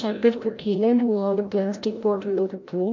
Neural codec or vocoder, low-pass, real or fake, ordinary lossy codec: codec, 16 kHz, 0.5 kbps, FreqCodec, larger model; 7.2 kHz; fake; MP3, 48 kbps